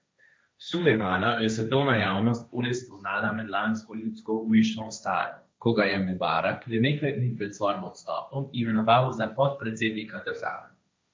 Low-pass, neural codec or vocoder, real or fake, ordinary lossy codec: none; codec, 16 kHz, 1.1 kbps, Voila-Tokenizer; fake; none